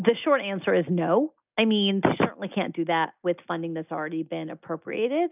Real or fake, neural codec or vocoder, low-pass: real; none; 3.6 kHz